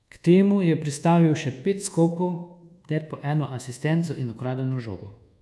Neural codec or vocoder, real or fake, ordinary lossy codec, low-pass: codec, 24 kHz, 1.2 kbps, DualCodec; fake; none; none